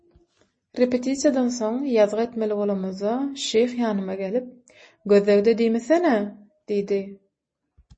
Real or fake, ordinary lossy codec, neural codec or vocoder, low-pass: real; MP3, 32 kbps; none; 9.9 kHz